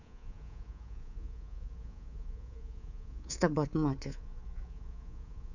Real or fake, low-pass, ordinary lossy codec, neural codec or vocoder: fake; 7.2 kHz; none; codec, 24 kHz, 3.1 kbps, DualCodec